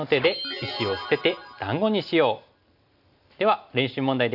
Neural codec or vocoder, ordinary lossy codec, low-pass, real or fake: none; none; 5.4 kHz; real